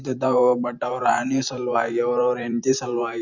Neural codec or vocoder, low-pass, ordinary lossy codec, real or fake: vocoder, 44.1 kHz, 128 mel bands every 512 samples, BigVGAN v2; 7.2 kHz; none; fake